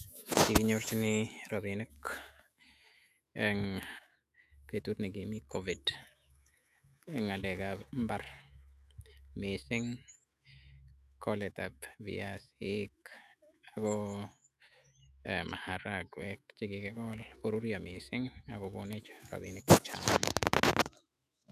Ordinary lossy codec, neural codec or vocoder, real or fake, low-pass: none; autoencoder, 48 kHz, 128 numbers a frame, DAC-VAE, trained on Japanese speech; fake; 14.4 kHz